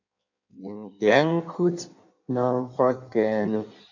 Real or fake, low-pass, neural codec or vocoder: fake; 7.2 kHz; codec, 16 kHz in and 24 kHz out, 1.1 kbps, FireRedTTS-2 codec